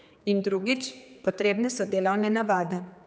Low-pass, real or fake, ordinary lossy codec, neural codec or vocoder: none; fake; none; codec, 16 kHz, 4 kbps, X-Codec, HuBERT features, trained on general audio